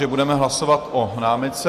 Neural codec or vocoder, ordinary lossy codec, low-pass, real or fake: none; Opus, 64 kbps; 14.4 kHz; real